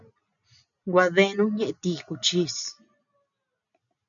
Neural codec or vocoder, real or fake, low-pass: none; real; 7.2 kHz